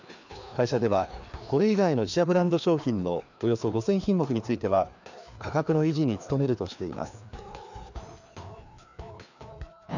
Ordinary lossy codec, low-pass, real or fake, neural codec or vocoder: none; 7.2 kHz; fake; codec, 16 kHz, 2 kbps, FreqCodec, larger model